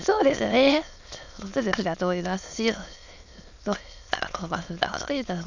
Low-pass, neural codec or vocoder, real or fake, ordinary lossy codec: 7.2 kHz; autoencoder, 22.05 kHz, a latent of 192 numbers a frame, VITS, trained on many speakers; fake; none